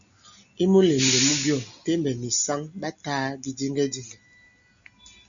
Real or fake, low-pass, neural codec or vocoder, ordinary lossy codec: real; 7.2 kHz; none; MP3, 96 kbps